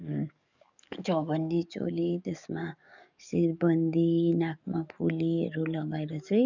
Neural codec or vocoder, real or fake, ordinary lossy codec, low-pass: codec, 44.1 kHz, 7.8 kbps, DAC; fake; none; 7.2 kHz